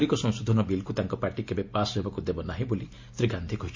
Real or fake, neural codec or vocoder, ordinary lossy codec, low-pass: real; none; MP3, 48 kbps; 7.2 kHz